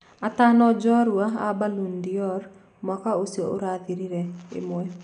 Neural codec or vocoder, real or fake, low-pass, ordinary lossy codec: none; real; 9.9 kHz; none